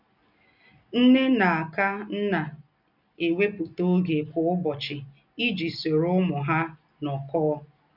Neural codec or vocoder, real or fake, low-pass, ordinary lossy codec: none; real; 5.4 kHz; none